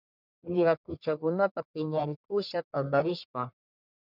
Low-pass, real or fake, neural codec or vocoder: 5.4 kHz; fake; codec, 44.1 kHz, 1.7 kbps, Pupu-Codec